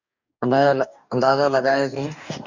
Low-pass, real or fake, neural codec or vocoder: 7.2 kHz; fake; codec, 44.1 kHz, 2.6 kbps, DAC